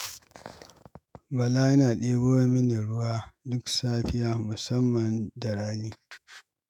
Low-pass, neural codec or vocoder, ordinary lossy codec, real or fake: 19.8 kHz; autoencoder, 48 kHz, 128 numbers a frame, DAC-VAE, trained on Japanese speech; none; fake